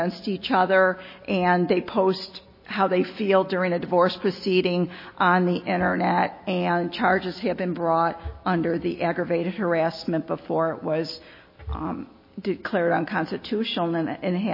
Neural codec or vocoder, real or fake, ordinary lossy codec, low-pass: none; real; MP3, 24 kbps; 5.4 kHz